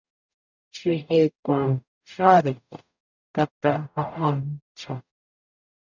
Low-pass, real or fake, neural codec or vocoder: 7.2 kHz; fake; codec, 44.1 kHz, 0.9 kbps, DAC